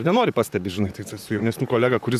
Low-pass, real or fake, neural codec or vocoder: 14.4 kHz; fake; vocoder, 44.1 kHz, 128 mel bands, Pupu-Vocoder